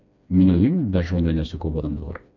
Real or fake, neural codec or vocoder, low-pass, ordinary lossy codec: fake; codec, 16 kHz, 2 kbps, FreqCodec, smaller model; 7.2 kHz; none